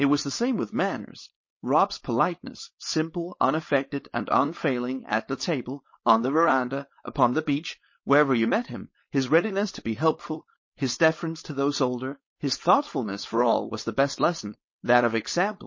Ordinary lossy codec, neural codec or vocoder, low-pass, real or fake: MP3, 32 kbps; codec, 16 kHz, 4.8 kbps, FACodec; 7.2 kHz; fake